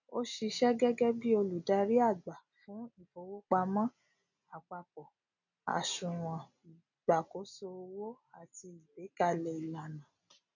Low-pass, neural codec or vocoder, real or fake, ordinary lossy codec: 7.2 kHz; none; real; none